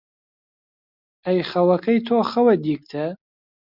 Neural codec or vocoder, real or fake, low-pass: none; real; 5.4 kHz